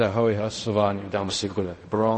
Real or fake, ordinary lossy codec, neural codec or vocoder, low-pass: fake; MP3, 32 kbps; codec, 16 kHz in and 24 kHz out, 0.4 kbps, LongCat-Audio-Codec, fine tuned four codebook decoder; 10.8 kHz